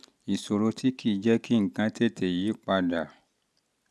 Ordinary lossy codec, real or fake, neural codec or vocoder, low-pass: none; real; none; none